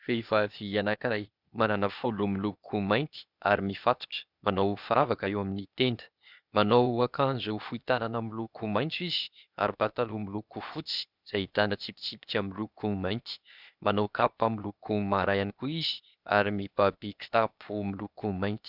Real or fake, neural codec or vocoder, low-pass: fake; codec, 16 kHz, 0.8 kbps, ZipCodec; 5.4 kHz